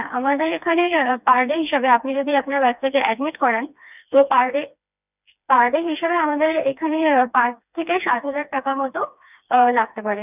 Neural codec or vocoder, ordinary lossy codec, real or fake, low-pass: codec, 16 kHz, 2 kbps, FreqCodec, smaller model; none; fake; 3.6 kHz